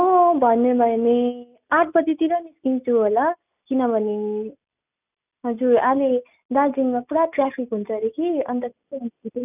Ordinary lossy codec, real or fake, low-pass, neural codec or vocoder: none; real; 3.6 kHz; none